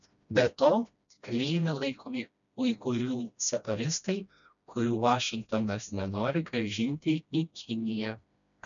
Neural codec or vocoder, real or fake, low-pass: codec, 16 kHz, 1 kbps, FreqCodec, smaller model; fake; 7.2 kHz